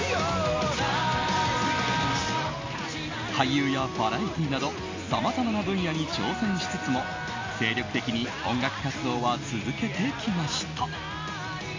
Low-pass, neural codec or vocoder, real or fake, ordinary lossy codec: 7.2 kHz; none; real; MP3, 48 kbps